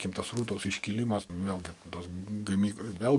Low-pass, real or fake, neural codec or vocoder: 10.8 kHz; fake; codec, 44.1 kHz, 7.8 kbps, DAC